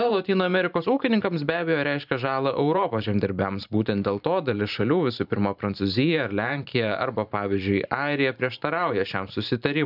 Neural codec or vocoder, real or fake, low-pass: vocoder, 44.1 kHz, 128 mel bands every 512 samples, BigVGAN v2; fake; 5.4 kHz